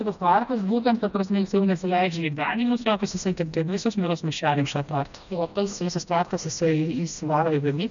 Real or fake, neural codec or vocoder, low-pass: fake; codec, 16 kHz, 1 kbps, FreqCodec, smaller model; 7.2 kHz